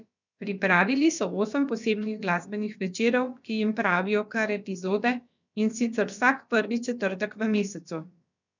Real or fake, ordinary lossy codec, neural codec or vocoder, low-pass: fake; none; codec, 16 kHz, about 1 kbps, DyCAST, with the encoder's durations; 7.2 kHz